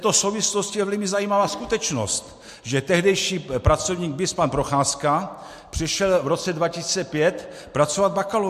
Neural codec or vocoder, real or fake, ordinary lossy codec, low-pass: none; real; MP3, 64 kbps; 14.4 kHz